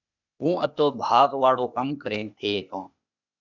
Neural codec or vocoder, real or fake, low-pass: codec, 16 kHz, 0.8 kbps, ZipCodec; fake; 7.2 kHz